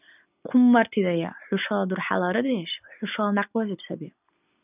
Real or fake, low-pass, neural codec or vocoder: real; 3.6 kHz; none